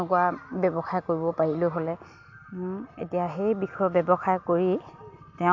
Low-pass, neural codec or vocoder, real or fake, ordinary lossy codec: 7.2 kHz; none; real; MP3, 48 kbps